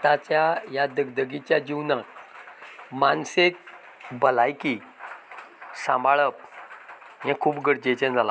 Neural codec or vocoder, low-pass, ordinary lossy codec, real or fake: none; none; none; real